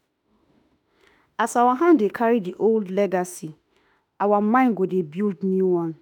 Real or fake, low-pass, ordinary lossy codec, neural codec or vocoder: fake; 19.8 kHz; none; autoencoder, 48 kHz, 32 numbers a frame, DAC-VAE, trained on Japanese speech